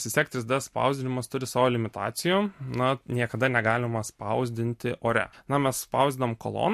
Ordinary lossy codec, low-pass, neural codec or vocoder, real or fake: MP3, 64 kbps; 14.4 kHz; none; real